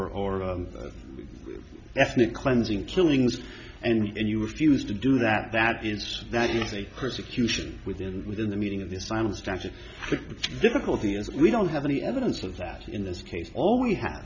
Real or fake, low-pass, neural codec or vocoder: real; 7.2 kHz; none